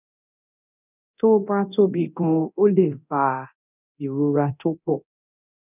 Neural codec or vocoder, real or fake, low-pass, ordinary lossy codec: codec, 24 kHz, 0.9 kbps, DualCodec; fake; 3.6 kHz; none